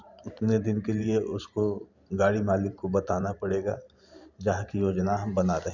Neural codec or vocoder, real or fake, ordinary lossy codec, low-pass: vocoder, 44.1 kHz, 128 mel bands every 512 samples, BigVGAN v2; fake; none; 7.2 kHz